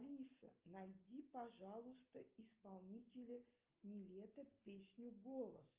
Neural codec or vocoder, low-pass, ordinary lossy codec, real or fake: none; 3.6 kHz; Opus, 24 kbps; real